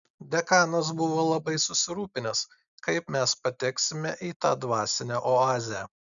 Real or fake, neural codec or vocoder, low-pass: real; none; 7.2 kHz